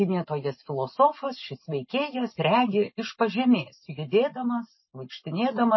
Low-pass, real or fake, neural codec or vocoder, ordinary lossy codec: 7.2 kHz; real; none; MP3, 24 kbps